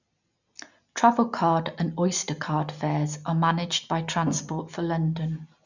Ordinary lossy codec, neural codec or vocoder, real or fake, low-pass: none; none; real; 7.2 kHz